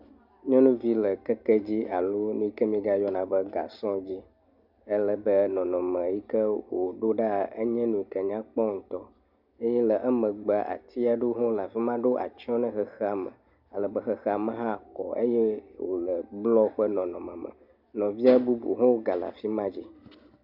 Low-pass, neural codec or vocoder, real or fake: 5.4 kHz; none; real